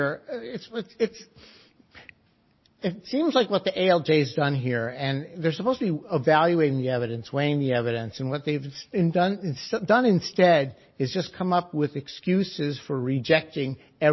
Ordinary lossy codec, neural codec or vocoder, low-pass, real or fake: MP3, 24 kbps; none; 7.2 kHz; real